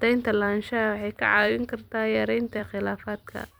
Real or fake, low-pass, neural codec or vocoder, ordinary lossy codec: fake; none; vocoder, 44.1 kHz, 128 mel bands every 256 samples, BigVGAN v2; none